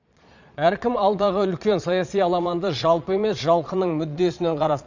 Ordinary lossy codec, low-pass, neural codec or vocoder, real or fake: none; 7.2 kHz; vocoder, 22.05 kHz, 80 mel bands, Vocos; fake